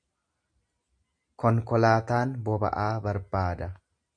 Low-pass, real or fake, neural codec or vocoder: 9.9 kHz; real; none